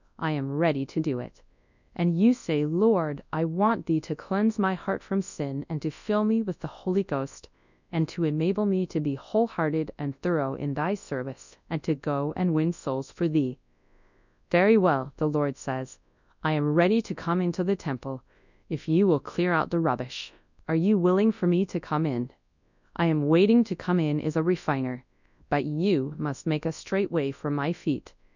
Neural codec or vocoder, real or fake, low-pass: codec, 24 kHz, 0.9 kbps, WavTokenizer, large speech release; fake; 7.2 kHz